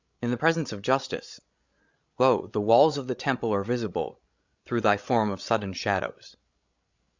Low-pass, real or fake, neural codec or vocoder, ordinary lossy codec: 7.2 kHz; fake; codec, 16 kHz, 16 kbps, FreqCodec, larger model; Opus, 64 kbps